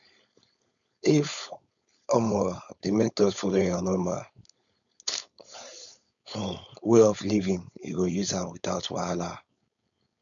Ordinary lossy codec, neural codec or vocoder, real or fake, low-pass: none; codec, 16 kHz, 4.8 kbps, FACodec; fake; 7.2 kHz